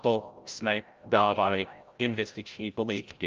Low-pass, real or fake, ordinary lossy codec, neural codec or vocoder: 7.2 kHz; fake; Opus, 24 kbps; codec, 16 kHz, 0.5 kbps, FreqCodec, larger model